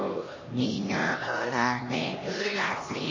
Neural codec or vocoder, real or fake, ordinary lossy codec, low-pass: codec, 16 kHz, 1 kbps, X-Codec, HuBERT features, trained on LibriSpeech; fake; MP3, 32 kbps; 7.2 kHz